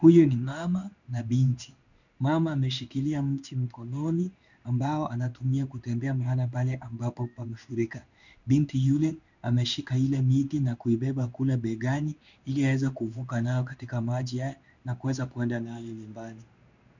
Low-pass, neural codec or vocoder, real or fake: 7.2 kHz; codec, 16 kHz in and 24 kHz out, 1 kbps, XY-Tokenizer; fake